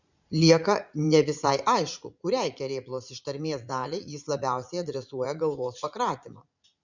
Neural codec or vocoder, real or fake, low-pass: vocoder, 44.1 kHz, 80 mel bands, Vocos; fake; 7.2 kHz